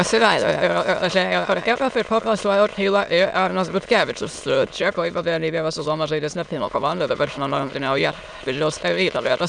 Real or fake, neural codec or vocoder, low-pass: fake; autoencoder, 22.05 kHz, a latent of 192 numbers a frame, VITS, trained on many speakers; 9.9 kHz